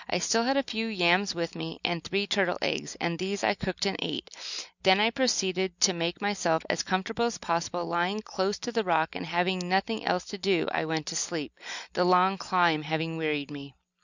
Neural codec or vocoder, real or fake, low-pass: none; real; 7.2 kHz